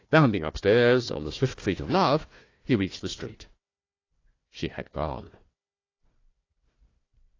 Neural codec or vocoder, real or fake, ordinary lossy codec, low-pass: codec, 16 kHz, 1 kbps, FunCodec, trained on Chinese and English, 50 frames a second; fake; AAC, 32 kbps; 7.2 kHz